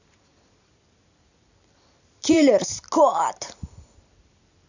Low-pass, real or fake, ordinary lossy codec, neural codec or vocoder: 7.2 kHz; fake; none; vocoder, 44.1 kHz, 128 mel bands every 256 samples, BigVGAN v2